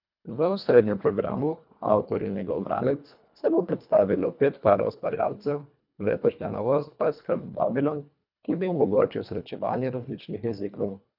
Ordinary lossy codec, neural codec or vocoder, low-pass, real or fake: none; codec, 24 kHz, 1.5 kbps, HILCodec; 5.4 kHz; fake